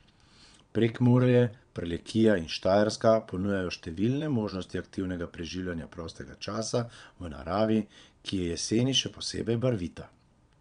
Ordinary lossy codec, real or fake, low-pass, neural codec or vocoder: none; fake; 9.9 kHz; vocoder, 22.05 kHz, 80 mel bands, Vocos